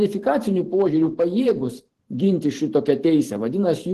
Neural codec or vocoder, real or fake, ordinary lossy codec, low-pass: vocoder, 44.1 kHz, 128 mel bands every 512 samples, BigVGAN v2; fake; Opus, 16 kbps; 14.4 kHz